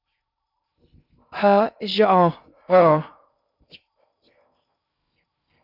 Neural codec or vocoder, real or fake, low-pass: codec, 16 kHz in and 24 kHz out, 0.6 kbps, FocalCodec, streaming, 2048 codes; fake; 5.4 kHz